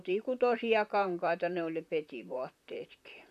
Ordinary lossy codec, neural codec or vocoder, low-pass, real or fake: none; vocoder, 44.1 kHz, 128 mel bands, Pupu-Vocoder; 14.4 kHz; fake